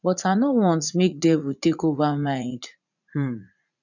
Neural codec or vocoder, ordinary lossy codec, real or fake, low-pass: vocoder, 44.1 kHz, 80 mel bands, Vocos; none; fake; 7.2 kHz